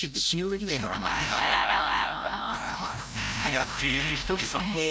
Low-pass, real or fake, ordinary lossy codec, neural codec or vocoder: none; fake; none; codec, 16 kHz, 0.5 kbps, FreqCodec, larger model